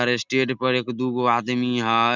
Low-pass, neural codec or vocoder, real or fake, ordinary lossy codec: 7.2 kHz; none; real; none